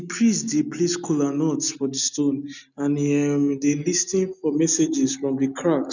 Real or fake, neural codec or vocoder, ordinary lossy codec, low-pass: real; none; none; 7.2 kHz